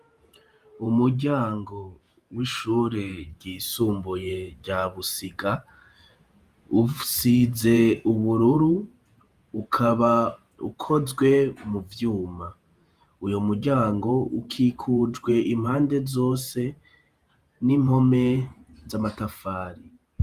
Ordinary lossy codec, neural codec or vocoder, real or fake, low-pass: Opus, 32 kbps; vocoder, 48 kHz, 128 mel bands, Vocos; fake; 14.4 kHz